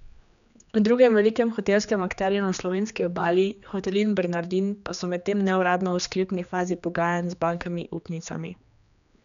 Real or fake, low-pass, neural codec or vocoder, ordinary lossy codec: fake; 7.2 kHz; codec, 16 kHz, 2 kbps, X-Codec, HuBERT features, trained on general audio; none